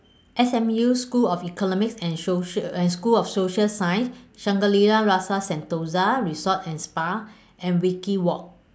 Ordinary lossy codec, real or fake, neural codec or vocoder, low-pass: none; real; none; none